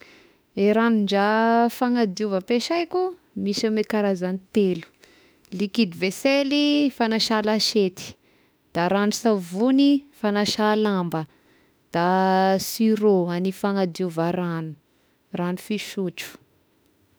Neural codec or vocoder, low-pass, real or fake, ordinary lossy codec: autoencoder, 48 kHz, 32 numbers a frame, DAC-VAE, trained on Japanese speech; none; fake; none